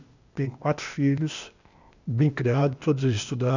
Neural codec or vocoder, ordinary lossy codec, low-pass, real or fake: codec, 16 kHz, 0.8 kbps, ZipCodec; none; 7.2 kHz; fake